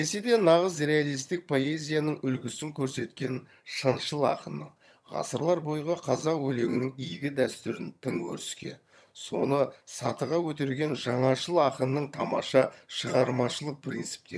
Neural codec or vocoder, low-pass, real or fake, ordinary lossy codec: vocoder, 22.05 kHz, 80 mel bands, HiFi-GAN; none; fake; none